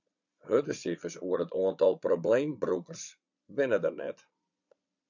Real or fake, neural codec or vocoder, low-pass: fake; vocoder, 22.05 kHz, 80 mel bands, Vocos; 7.2 kHz